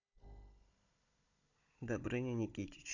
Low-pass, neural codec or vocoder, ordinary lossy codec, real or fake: 7.2 kHz; codec, 16 kHz, 16 kbps, FunCodec, trained on Chinese and English, 50 frames a second; none; fake